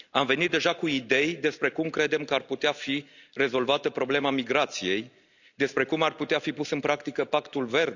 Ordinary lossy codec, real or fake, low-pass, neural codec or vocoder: none; real; 7.2 kHz; none